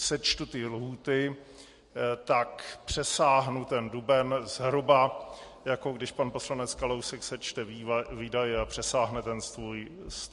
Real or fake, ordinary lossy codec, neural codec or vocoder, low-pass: real; MP3, 48 kbps; none; 14.4 kHz